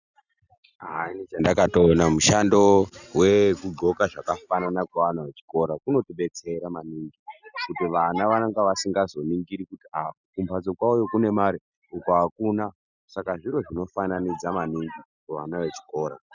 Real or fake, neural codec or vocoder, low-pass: real; none; 7.2 kHz